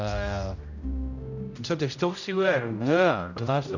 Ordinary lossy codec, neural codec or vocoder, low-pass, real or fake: none; codec, 16 kHz, 0.5 kbps, X-Codec, HuBERT features, trained on general audio; 7.2 kHz; fake